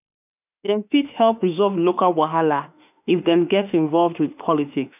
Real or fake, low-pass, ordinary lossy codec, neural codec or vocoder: fake; 3.6 kHz; none; autoencoder, 48 kHz, 32 numbers a frame, DAC-VAE, trained on Japanese speech